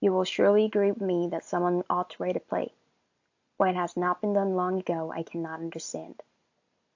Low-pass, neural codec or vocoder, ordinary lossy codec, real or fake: 7.2 kHz; none; AAC, 48 kbps; real